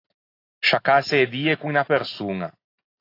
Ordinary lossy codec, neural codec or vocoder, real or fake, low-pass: AAC, 32 kbps; none; real; 5.4 kHz